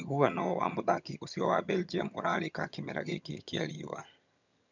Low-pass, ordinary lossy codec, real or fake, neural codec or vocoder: 7.2 kHz; none; fake; vocoder, 22.05 kHz, 80 mel bands, HiFi-GAN